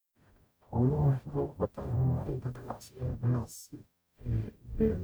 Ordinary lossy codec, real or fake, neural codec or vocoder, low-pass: none; fake; codec, 44.1 kHz, 0.9 kbps, DAC; none